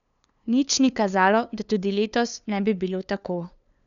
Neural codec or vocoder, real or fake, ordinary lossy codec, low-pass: codec, 16 kHz, 2 kbps, FunCodec, trained on LibriTTS, 25 frames a second; fake; none; 7.2 kHz